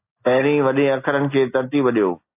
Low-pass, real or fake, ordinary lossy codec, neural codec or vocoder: 3.6 kHz; real; AAC, 32 kbps; none